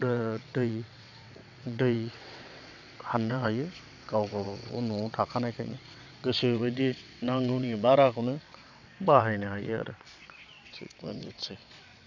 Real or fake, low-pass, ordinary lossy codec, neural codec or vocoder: fake; 7.2 kHz; none; vocoder, 22.05 kHz, 80 mel bands, WaveNeXt